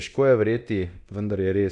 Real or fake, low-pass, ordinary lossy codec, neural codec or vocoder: fake; 10.8 kHz; Opus, 64 kbps; codec, 24 kHz, 0.9 kbps, DualCodec